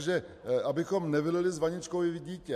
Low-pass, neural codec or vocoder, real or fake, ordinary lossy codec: 14.4 kHz; none; real; MP3, 64 kbps